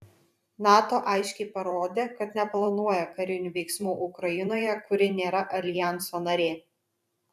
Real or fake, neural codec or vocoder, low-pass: fake; vocoder, 44.1 kHz, 128 mel bands every 512 samples, BigVGAN v2; 14.4 kHz